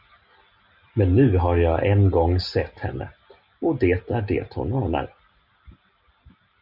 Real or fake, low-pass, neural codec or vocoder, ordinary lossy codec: real; 5.4 kHz; none; Opus, 64 kbps